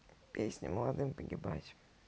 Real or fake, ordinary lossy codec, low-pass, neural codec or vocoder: real; none; none; none